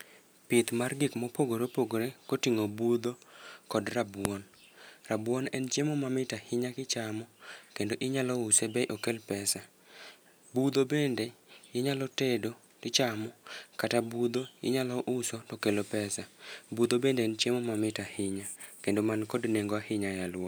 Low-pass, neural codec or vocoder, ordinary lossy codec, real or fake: none; none; none; real